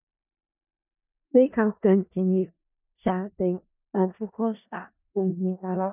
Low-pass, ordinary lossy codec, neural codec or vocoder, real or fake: 3.6 kHz; none; codec, 16 kHz in and 24 kHz out, 0.4 kbps, LongCat-Audio-Codec, four codebook decoder; fake